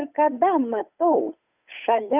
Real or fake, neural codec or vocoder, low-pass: fake; codec, 16 kHz, 8 kbps, FunCodec, trained on Chinese and English, 25 frames a second; 3.6 kHz